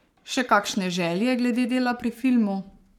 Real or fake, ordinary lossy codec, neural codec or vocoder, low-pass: fake; none; codec, 44.1 kHz, 7.8 kbps, Pupu-Codec; 19.8 kHz